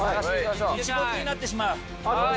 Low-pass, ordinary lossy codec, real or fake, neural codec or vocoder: none; none; real; none